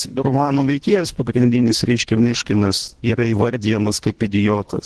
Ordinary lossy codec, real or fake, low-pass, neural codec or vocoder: Opus, 16 kbps; fake; 10.8 kHz; codec, 24 kHz, 1.5 kbps, HILCodec